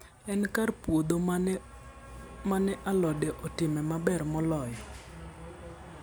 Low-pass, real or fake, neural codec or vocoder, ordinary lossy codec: none; real; none; none